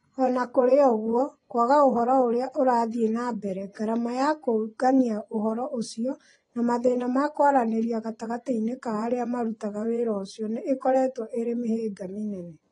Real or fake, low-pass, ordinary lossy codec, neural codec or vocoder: fake; 10.8 kHz; AAC, 32 kbps; vocoder, 24 kHz, 100 mel bands, Vocos